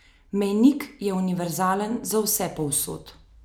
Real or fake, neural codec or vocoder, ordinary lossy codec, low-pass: real; none; none; none